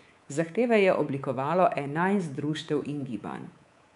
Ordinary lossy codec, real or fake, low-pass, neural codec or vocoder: none; fake; 10.8 kHz; codec, 24 kHz, 3.1 kbps, DualCodec